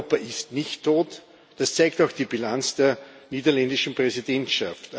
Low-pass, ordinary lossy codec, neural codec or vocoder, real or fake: none; none; none; real